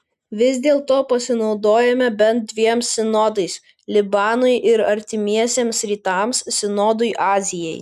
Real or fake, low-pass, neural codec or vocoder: real; 14.4 kHz; none